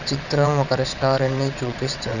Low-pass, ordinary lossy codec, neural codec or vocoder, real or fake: 7.2 kHz; none; vocoder, 22.05 kHz, 80 mel bands, WaveNeXt; fake